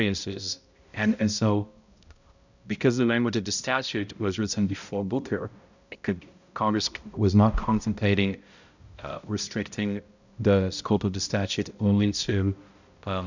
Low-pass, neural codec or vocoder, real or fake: 7.2 kHz; codec, 16 kHz, 0.5 kbps, X-Codec, HuBERT features, trained on balanced general audio; fake